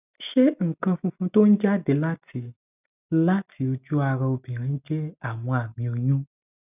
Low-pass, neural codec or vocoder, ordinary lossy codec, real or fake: 3.6 kHz; none; none; real